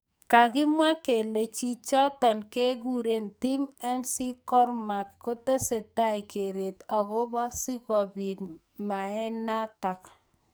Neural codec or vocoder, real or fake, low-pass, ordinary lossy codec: codec, 44.1 kHz, 2.6 kbps, SNAC; fake; none; none